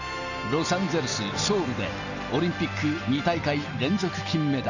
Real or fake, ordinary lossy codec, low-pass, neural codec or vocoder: real; Opus, 64 kbps; 7.2 kHz; none